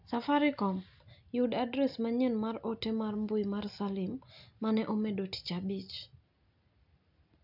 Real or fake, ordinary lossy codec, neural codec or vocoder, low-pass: real; none; none; 5.4 kHz